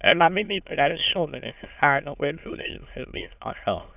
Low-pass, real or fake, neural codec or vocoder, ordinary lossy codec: 3.6 kHz; fake; autoencoder, 22.05 kHz, a latent of 192 numbers a frame, VITS, trained on many speakers; none